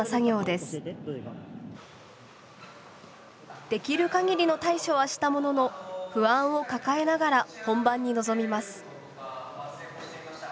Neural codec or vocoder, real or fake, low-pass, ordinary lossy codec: none; real; none; none